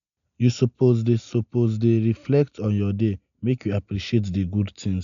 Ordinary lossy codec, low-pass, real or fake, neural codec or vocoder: none; 7.2 kHz; real; none